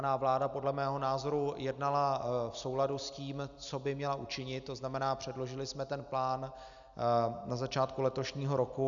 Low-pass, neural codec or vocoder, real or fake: 7.2 kHz; none; real